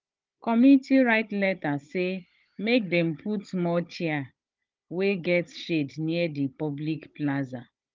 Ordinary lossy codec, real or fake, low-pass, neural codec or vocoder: Opus, 32 kbps; fake; 7.2 kHz; codec, 16 kHz, 16 kbps, FunCodec, trained on Chinese and English, 50 frames a second